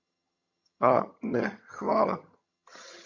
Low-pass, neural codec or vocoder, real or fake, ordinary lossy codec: 7.2 kHz; vocoder, 22.05 kHz, 80 mel bands, HiFi-GAN; fake; MP3, 48 kbps